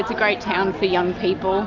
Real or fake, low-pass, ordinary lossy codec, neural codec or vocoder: real; 7.2 kHz; AAC, 48 kbps; none